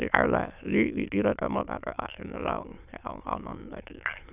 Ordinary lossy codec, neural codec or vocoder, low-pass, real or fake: none; autoencoder, 22.05 kHz, a latent of 192 numbers a frame, VITS, trained on many speakers; 3.6 kHz; fake